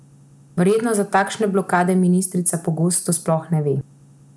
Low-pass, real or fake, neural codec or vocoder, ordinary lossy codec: none; real; none; none